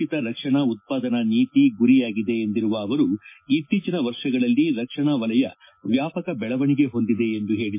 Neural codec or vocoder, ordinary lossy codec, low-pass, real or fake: none; MP3, 24 kbps; 3.6 kHz; real